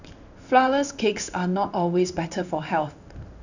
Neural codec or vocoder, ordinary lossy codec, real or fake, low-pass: codec, 16 kHz in and 24 kHz out, 1 kbps, XY-Tokenizer; none; fake; 7.2 kHz